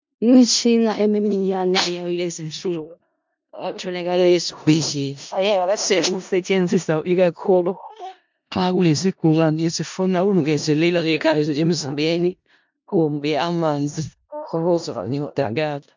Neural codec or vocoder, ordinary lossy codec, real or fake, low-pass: codec, 16 kHz in and 24 kHz out, 0.4 kbps, LongCat-Audio-Codec, four codebook decoder; MP3, 64 kbps; fake; 7.2 kHz